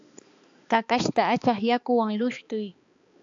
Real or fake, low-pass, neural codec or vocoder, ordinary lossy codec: fake; 7.2 kHz; codec, 16 kHz, 2 kbps, X-Codec, HuBERT features, trained on balanced general audio; AAC, 64 kbps